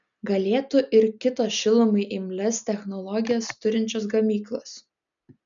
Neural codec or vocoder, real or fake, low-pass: none; real; 7.2 kHz